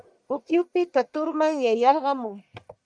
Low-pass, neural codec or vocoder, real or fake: 9.9 kHz; codec, 44.1 kHz, 1.7 kbps, Pupu-Codec; fake